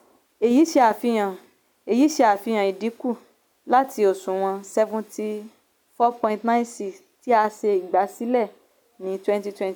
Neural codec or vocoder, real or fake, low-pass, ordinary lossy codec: none; real; none; none